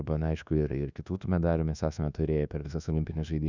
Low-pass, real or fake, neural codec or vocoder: 7.2 kHz; fake; codec, 24 kHz, 1.2 kbps, DualCodec